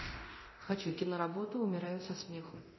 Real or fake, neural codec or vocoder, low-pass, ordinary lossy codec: fake; codec, 24 kHz, 0.9 kbps, DualCodec; 7.2 kHz; MP3, 24 kbps